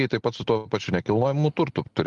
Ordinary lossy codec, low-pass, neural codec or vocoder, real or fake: Opus, 32 kbps; 10.8 kHz; none; real